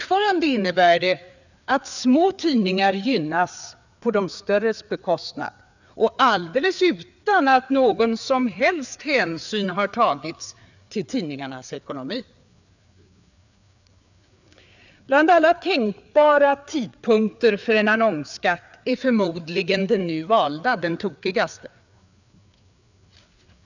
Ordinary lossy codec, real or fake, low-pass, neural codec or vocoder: none; fake; 7.2 kHz; codec, 16 kHz, 4 kbps, FreqCodec, larger model